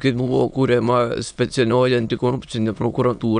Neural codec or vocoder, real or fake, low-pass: autoencoder, 22.05 kHz, a latent of 192 numbers a frame, VITS, trained on many speakers; fake; 9.9 kHz